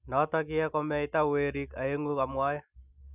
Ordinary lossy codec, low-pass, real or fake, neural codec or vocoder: none; 3.6 kHz; real; none